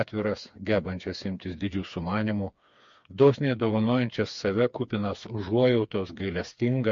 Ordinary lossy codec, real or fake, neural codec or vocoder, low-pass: MP3, 48 kbps; fake; codec, 16 kHz, 4 kbps, FreqCodec, smaller model; 7.2 kHz